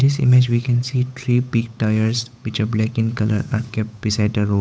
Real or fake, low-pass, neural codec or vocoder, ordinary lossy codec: fake; none; codec, 16 kHz, 8 kbps, FunCodec, trained on Chinese and English, 25 frames a second; none